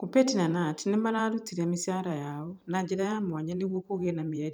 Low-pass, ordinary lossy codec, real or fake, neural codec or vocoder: none; none; fake; vocoder, 44.1 kHz, 128 mel bands every 512 samples, BigVGAN v2